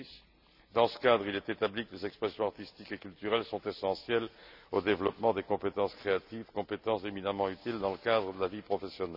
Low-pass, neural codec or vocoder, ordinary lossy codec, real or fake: 5.4 kHz; none; none; real